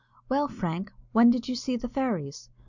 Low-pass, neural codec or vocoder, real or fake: 7.2 kHz; none; real